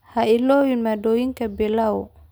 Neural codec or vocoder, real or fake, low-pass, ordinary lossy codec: none; real; none; none